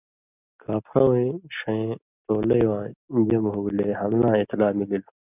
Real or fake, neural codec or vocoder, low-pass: real; none; 3.6 kHz